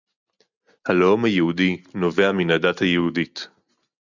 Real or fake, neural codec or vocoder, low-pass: real; none; 7.2 kHz